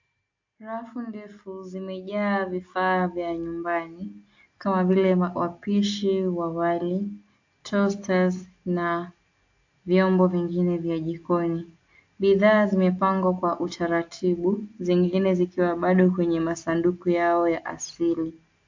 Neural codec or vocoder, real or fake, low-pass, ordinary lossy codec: none; real; 7.2 kHz; AAC, 48 kbps